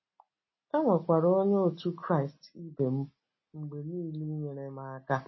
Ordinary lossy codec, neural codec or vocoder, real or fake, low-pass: MP3, 24 kbps; none; real; 7.2 kHz